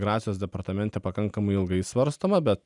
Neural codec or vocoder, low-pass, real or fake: none; 10.8 kHz; real